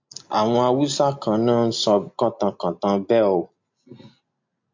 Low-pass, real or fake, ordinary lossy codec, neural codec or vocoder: 7.2 kHz; real; MP3, 48 kbps; none